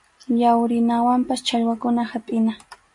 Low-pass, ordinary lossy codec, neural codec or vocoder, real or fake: 10.8 kHz; MP3, 48 kbps; none; real